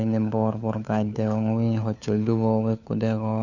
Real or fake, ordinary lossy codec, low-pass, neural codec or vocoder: fake; none; 7.2 kHz; codec, 16 kHz, 4 kbps, FunCodec, trained on LibriTTS, 50 frames a second